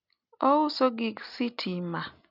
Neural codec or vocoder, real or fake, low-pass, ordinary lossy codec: none; real; 5.4 kHz; none